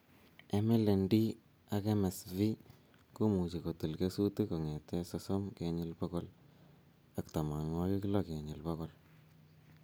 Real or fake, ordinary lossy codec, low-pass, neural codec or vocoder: real; none; none; none